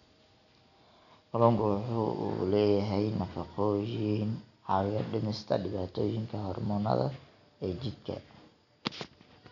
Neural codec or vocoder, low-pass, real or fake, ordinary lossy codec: none; 7.2 kHz; real; none